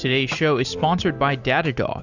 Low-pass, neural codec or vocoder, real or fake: 7.2 kHz; none; real